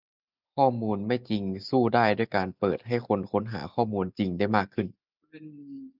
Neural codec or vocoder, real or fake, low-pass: none; real; 5.4 kHz